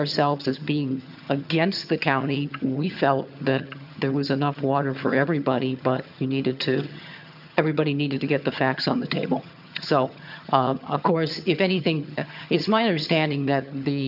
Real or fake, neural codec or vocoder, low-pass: fake; vocoder, 22.05 kHz, 80 mel bands, HiFi-GAN; 5.4 kHz